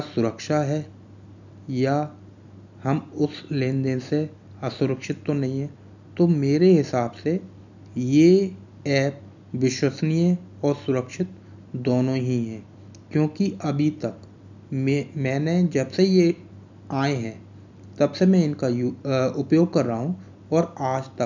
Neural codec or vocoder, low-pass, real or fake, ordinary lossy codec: none; 7.2 kHz; real; none